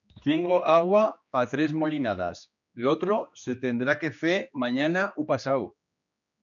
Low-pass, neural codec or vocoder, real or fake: 7.2 kHz; codec, 16 kHz, 2 kbps, X-Codec, HuBERT features, trained on general audio; fake